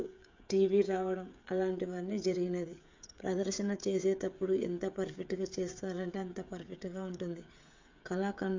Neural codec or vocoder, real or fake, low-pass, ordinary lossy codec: codec, 16 kHz, 16 kbps, FreqCodec, smaller model; fake; 7.2 kHz; MP3, 64 kbps